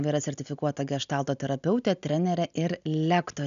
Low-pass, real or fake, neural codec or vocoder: 7.2 kHz; real; none